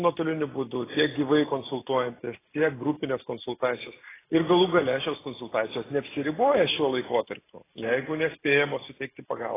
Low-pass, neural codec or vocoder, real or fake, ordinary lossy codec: 3.6 kHz; none; real; AAC, 16 kbps